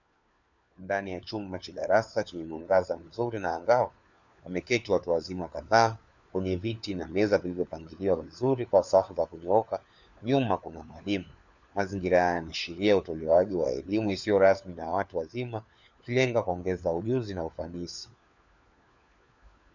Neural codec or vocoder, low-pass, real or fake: codec, 16 kHz, 4 kbps, FunCodec, trained on LibriTTS, 50 frames a second; 7.2 kHz; fake